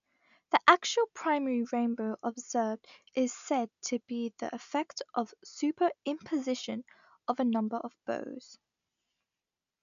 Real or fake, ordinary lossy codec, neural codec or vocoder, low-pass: real; none; none; 7.2 kHz